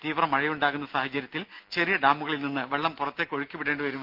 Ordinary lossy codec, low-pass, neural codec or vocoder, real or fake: Opus, 32 kbps; 5.4 kHz; none; real